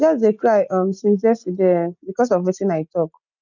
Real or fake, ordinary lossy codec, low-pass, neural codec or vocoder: real; none; 7.2 kHz; none